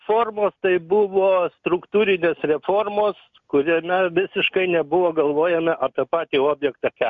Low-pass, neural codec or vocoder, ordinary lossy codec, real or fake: 7.2 kHz; none; MP3, 64 kbps; real